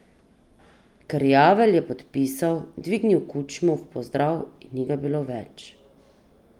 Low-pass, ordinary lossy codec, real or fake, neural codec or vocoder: 19.8 kHz; Opus, 32 kbps; real; none